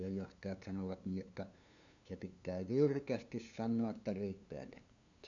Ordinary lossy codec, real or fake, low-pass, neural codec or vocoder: none; fake; 7.2 kHz; codec, 16 kHz, 2 kbps, FunCodec, trained on LibriTTS, 25 frames a second